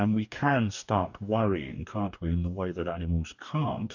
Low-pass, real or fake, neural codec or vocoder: 7.2 kHz; fake; codec, 44.1 kHz, 2.6 kbps, DAC